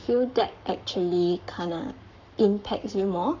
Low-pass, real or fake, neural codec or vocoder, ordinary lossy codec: 7.2 kHz; fake; codec, 44.1 kHz, 7.8 kbps, Pupu-Codec; none